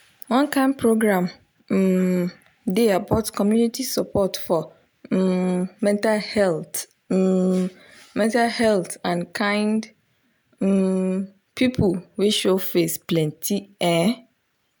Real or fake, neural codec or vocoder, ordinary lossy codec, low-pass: real; none; none; none